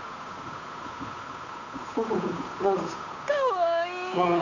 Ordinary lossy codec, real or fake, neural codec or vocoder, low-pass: Opus, 64 kbps; fake; codec, 16 kHz, 8 kbps, FunCodec, trained on Chinese and English, 25 frames a second; 7.2 kHz